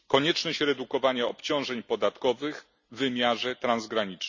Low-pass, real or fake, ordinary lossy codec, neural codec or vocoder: 7.2 kHz; real; none; none